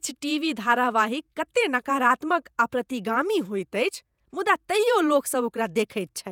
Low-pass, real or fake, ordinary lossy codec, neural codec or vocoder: 19.8 kHz; fake; none; vocoder, 48 kHz, 128 mel bands, Vocos